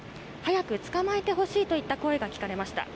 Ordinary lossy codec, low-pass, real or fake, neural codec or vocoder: none; none; real; none